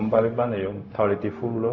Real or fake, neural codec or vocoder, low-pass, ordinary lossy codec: fake; codec, 16 kHz, 0.4 kbps, LongCat-Audio-Codec; 7.2 kHz; AAC, 48 kbps